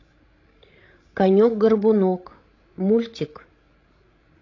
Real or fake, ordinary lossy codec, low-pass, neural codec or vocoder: fake; AAC, 32 kbps; 7.2 kHz; codec, 16 kHz, 16 kbps, FreqCodec, larger model